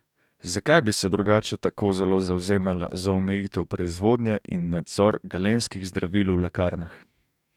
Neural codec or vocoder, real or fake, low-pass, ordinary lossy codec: codec, 44.1 kHz, 2.6 kbps, DAC; fake; 19.8 kHz; none